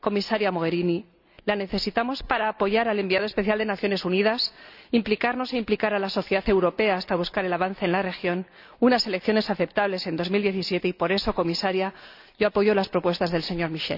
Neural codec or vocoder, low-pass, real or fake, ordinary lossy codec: none; 5.4 kHz; real; none